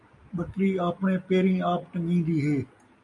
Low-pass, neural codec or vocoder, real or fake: 10.8 kHz; none; real